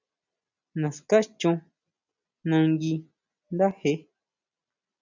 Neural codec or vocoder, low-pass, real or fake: none; 7.2 kHz; real